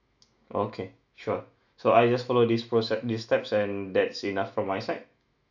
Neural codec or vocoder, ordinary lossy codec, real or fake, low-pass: autoencoder, 48 kHz, 128 numbers a frame, DAC-VAE, trained on Japanese speech; none; fake; 7.2 kHz